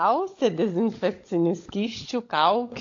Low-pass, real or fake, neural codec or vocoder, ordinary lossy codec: 7.2 kHz; fake; codec, 16 kHz, 16 kbps, FunCodec, trained on LibriTTS, 50 frames a second; AAC, 48 kbps